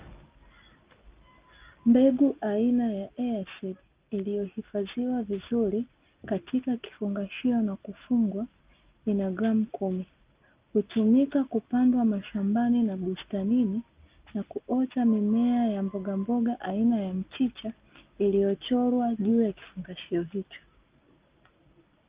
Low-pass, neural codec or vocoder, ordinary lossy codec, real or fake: 3.6 kHz; none; Opus, 24 kbps; real